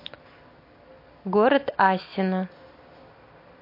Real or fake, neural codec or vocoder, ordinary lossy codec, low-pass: real; none; MP3, 32 kbps; 5.4 kHz